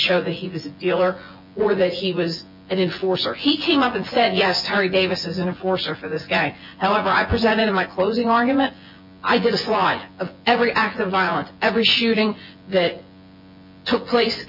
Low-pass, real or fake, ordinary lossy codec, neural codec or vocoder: 5.4 kHz; fake; MP3, 48 kbps; vocoder, 24 kHz, 100 mel bands, Vocos